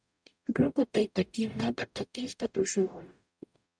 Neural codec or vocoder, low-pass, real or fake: codec, 44.1 kHz, 0.9 kbps, DAC; 9.9 kHz; fake